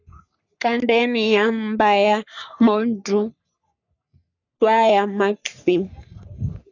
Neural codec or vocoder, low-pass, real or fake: codec, 44.1 kHz, 3.4 kbps, Pupu-Codec; 7.2 kHz; fake